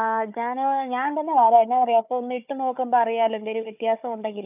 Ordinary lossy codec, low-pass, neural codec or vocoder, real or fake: none; 3.6 kHz; codec, 16 kHz, 4 kbps, FunCodec, trained on Chinese and English, 50 frames a second; fake